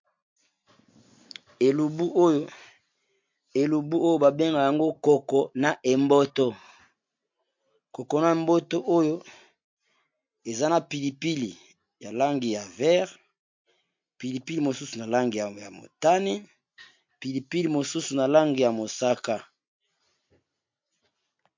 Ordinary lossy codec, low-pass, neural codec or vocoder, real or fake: MP3, 48 kbps; 7.2 kHz; none; real